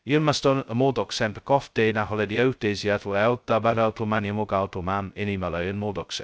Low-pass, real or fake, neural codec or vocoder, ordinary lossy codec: none; fake; codec, 16 kHz, 0.2 kbps, FocalCodec; none